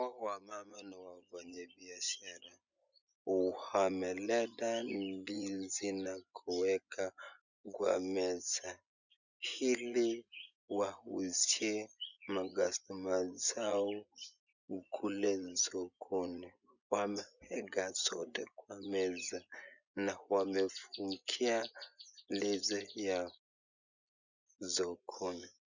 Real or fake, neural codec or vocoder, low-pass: fake; vocoder, 24 kHz, 100 mel bands, Vocos; 7.2 kHz